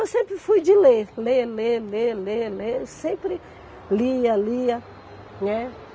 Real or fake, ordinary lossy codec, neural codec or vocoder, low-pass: real; none; none; none